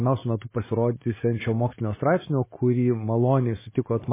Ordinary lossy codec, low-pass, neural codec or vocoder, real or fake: MP3, 16 kbps; 3.6 kHz; none; real